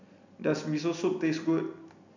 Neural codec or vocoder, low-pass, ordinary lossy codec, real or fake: none; 7.2 kHz; none; real